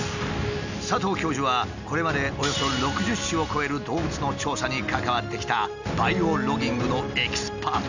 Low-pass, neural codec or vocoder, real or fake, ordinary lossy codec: 7.2 kHz; none; real; none